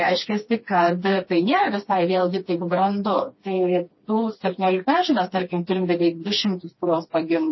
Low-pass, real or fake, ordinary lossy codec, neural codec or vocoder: 7.2 kHz; fake; MP3, 24 kbps; codec, 16 kHz, 2 kbps, FreqCodec, smaller model